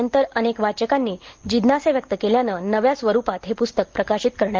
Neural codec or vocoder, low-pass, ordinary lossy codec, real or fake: none; 7.2 kHz; Opus, 16 kbps; real